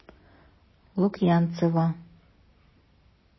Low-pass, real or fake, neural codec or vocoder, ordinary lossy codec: 7.2 kHz; real; none; MP3, 24 kbps